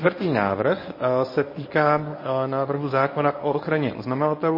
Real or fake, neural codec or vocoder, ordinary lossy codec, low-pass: fake; codec, 24 kHz, 0.9 kbps, WavTokenizer, medium speech release version 1; MP3, 24 kbps; 5.4 kHz